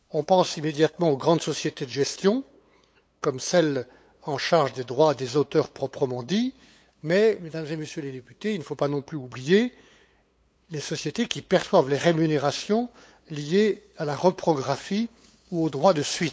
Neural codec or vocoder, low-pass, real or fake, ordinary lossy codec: codec, 16 kHz, 8 kbps, FunCodec, trained on LibriTTS, 25 frames a second; none; fake; none